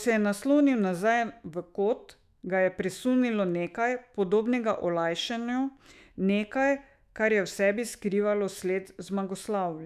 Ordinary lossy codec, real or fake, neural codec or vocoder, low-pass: none; fake; autoencoder, 48 kHz, 128 numbers a frame, DAC-VAE, trained on Japanese speech; 14.4 kHz